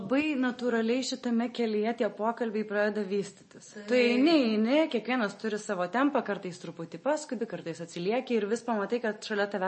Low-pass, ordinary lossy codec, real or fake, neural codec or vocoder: 10.8 kHz; MP3, 32 kbps; real; none